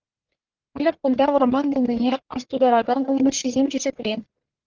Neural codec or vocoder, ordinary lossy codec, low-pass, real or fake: codec, 44.1 kHz, 1.7 kbps, Pupu-Codec; Opus, 16 kbps; 7.2 kHz; fake